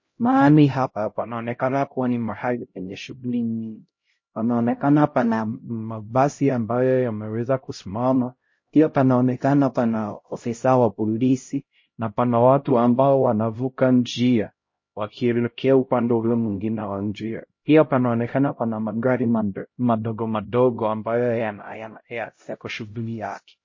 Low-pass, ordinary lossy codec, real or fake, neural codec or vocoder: 7.2 kHz; MP3, 32 kbps; fake; codec, 16 kHz, 0.5 kbps, X-Codec, HuBERT features, trained on LibriSpeech